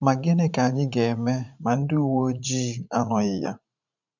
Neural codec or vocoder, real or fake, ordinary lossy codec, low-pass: vocoder, 44.1 kHz, 80 mel bands, Vocos; fake; none; 7.2 kHz